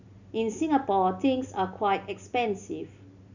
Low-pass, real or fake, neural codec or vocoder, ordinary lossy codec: 7.2 kHz; real; none; none